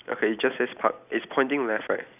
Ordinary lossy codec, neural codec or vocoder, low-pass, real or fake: none; none; 3.6 kHz; real